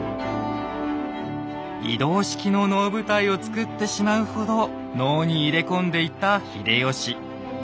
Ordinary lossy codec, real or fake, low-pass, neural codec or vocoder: none; real; none; none